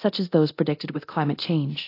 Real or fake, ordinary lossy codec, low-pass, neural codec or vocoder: fake; AAC, 32 kbps; 5.4 kHz; codec, 24 kHz, 0.9 kbps, DualCodec